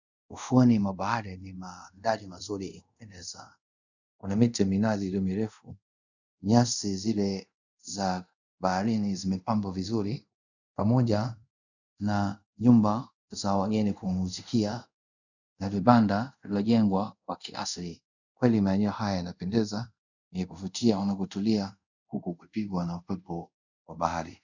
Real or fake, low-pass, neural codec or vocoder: fake; 7.2 kHz; codec, 24 kHz, 0.5 kbps, DualCodec